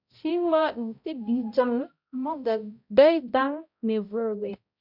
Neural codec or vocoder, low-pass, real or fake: codec, 16 kHz, 0.5 kbps, X-Codec, HuBERT features, trained on balanced general audio; 5.4 kHz; fake